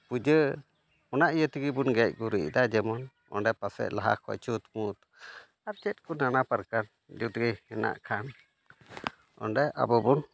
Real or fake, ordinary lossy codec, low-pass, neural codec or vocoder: real; none; none; none